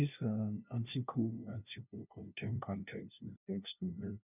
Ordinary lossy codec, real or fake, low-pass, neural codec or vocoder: none; fake; 3.6 kHz; codec, 16 kHz, 0.5 kbps, FunCodec, trained on LibriTTS, 25 frames a second